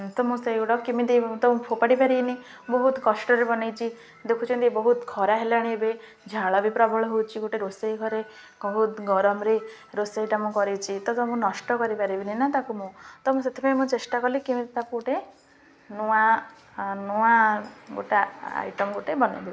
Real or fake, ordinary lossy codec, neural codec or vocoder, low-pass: real; none; none; none